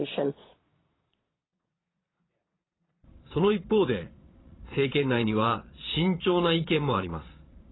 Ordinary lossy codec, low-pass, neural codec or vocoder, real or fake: AAC, 16 kbps; 7.2 kHz; vocoder, 22.05 kHz, 80 mel bands, Vocos; fake